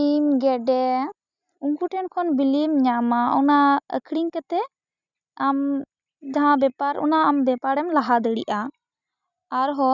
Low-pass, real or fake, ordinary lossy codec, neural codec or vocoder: 7.2 kHz; real; none; none